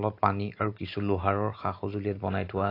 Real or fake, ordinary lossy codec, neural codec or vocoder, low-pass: real; AAC, 32 kbps; none; 5.4 kHz